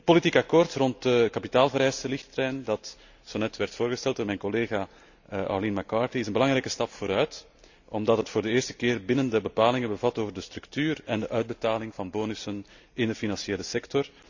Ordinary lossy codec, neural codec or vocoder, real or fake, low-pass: none; none; real; 7.2 kHz